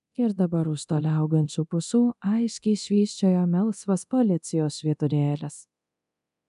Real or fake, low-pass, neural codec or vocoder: fake; 10.8 kHz; codec, 24 kHz, 0.9 kbps, DualCodec